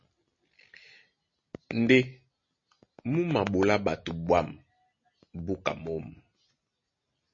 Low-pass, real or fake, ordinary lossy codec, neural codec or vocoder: 7.2 kHz; real; MP3, 32 kbps; none